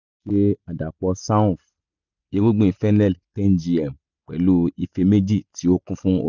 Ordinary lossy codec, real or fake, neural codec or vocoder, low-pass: none; real; none; 7.2 kHz